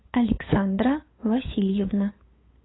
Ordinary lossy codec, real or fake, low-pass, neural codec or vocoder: AAC, 16 kbps; fake; 7.2 kHz; codec, 16 kHz, 8 kbps, FunCodec, trained on LibriTTS, 25 frames a second